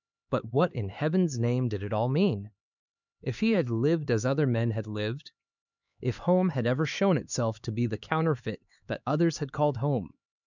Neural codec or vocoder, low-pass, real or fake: codec, 16 kHz, 4 kbps, X-Codec, HuBERT features, trained on LibriSpeech; 7.2 kHz; fake